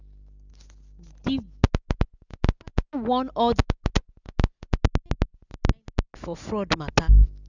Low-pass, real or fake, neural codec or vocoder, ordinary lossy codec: 7.2 kHz; real; none; none